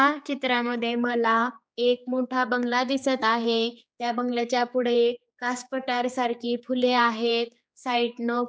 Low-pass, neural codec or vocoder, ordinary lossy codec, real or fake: none; codec, 16 kHz, 4 kbps, X-Codec, HuBERT features, trained on general audio; none; fake